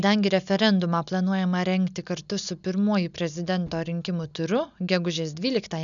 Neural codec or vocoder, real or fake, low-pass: none; real; 7.2 kHz